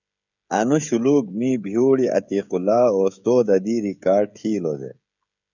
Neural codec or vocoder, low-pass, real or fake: codec, 16 kHz, 16 kbps, FreqCodec, smaller model; 7.2 kHz; fake